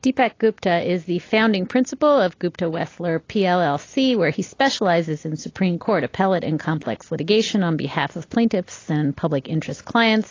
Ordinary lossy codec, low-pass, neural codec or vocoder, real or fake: AAC, 32 kbps; 7.2 kHz; none; real